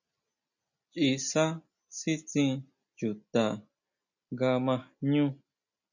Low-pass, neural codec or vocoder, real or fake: 7.2 kHz; none; real